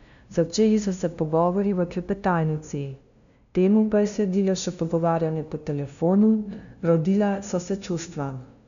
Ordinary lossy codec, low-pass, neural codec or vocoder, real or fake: none; 7.2 kHz; codec, 16 kHz, 0.5 kbps, FunCodec, trained on LibriTTS, 25 frames a second; fake